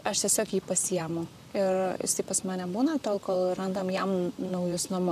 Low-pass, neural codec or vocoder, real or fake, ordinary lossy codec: 14.4 kHz; vocoder, 44.1 kHz, 128 mel bands, Pupu-Vocoder; fake; AAC, 96 kbps